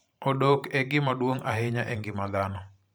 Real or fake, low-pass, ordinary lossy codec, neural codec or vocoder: fake; none; none; vocoder, 44.1 kHz, 128 mel bands every 512 samples, BigVGAN v2